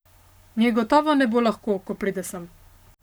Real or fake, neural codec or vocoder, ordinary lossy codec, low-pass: fake; codec, 44.1 kHz, 7.8 kbps, Pupu-Codec; none; none